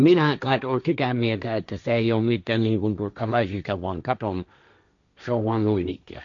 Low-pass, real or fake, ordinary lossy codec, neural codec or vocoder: 7.2 kHz; fake; none; codec, 16 kHz, 1.1 kbps, Voila-Tokenizer